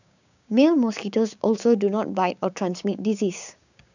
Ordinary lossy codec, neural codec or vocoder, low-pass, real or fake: none; codec, 16 kHz, 6 kbps, DAC; 7.2 kHz; fake